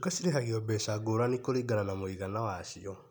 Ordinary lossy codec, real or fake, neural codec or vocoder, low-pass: none; real; none; 19.8 kHz